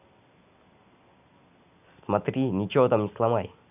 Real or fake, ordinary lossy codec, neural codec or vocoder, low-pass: real; none; none; 3.6 kHz